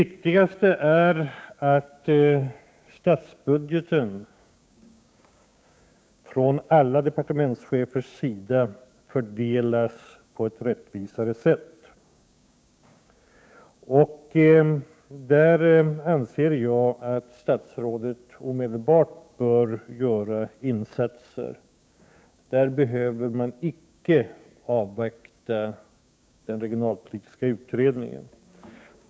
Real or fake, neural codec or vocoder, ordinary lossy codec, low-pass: fake; codec, 16 kHz, 6 kbps, DAC; none; none